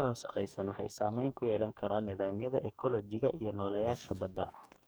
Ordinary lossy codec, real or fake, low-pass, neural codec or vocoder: none; fake; none; codec, 44.1 kHz, 2.6 kbps, DAC